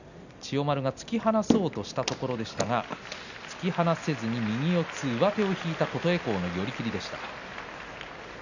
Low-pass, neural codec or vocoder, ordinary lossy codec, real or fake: 7.2 kHz; none; none; real